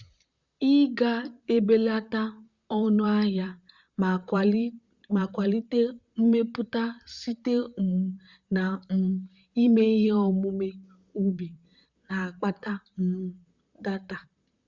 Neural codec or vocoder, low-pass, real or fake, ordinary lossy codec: vocoder, 44.1 kHz, 128 mel bands, Pupu-Vocoder; 7.2 kHz; fake; Opus, 64 kbps